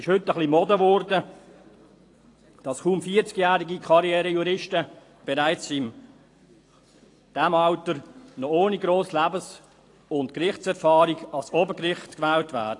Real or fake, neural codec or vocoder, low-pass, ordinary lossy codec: fake; vocoder, 44.1 kHz, 128 mel bands every 256 samples, BigVGAN v2; 10.8 kHz; AAC, 48 kbps